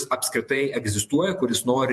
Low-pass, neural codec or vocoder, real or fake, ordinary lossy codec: 14.4 kHz; vocoder, 48 kHz, 128 mel bands, Vocos; fake; MP3, 64 kbps